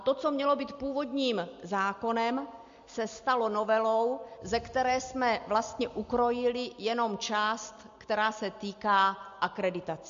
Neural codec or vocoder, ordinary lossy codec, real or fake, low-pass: none; MP3, 48 kbps; real; 7.2 kHz